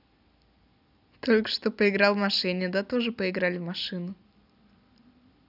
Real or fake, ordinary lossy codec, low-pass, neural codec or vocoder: real; none; 5.4 kHz; none